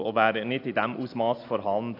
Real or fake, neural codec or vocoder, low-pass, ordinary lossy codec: real; none; 5.4 kHz; AAC, 32 kbps